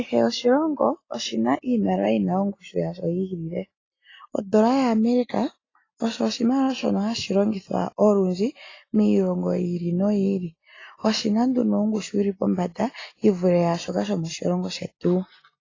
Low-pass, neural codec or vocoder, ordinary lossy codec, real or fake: 7.2 kHz; none; AAC, 32 kbps; real